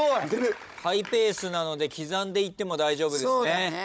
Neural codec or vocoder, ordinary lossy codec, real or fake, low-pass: codec, 16 kHz, 16 kbps, FunCodec, trained on Chinese and English, 50 frames a second; none; fake; none